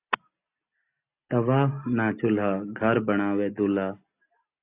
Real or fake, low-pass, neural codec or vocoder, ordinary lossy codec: real; 3.6 kHz; none; AAC, 32 kbps